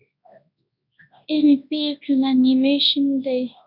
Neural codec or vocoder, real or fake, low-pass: codec, 24 kHz, 0.9 kbps, WavTokenizer, large speech release; fake; 5.4 kHz